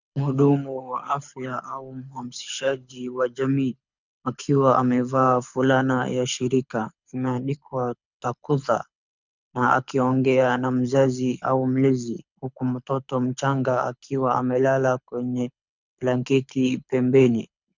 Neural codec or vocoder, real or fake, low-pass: codec, 24 kHz, 6 kbps, HILCodec; fake; 7.2 kHz